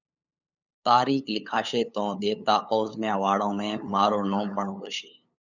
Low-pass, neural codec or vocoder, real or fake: 7.2 kHz; codec, 16 kHz, 8 kbps, FunCodec, trained on LibriTTS, 25 frames a second; fake